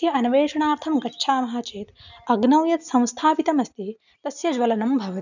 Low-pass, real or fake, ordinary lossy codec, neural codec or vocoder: 7.2 kHz; real; none; none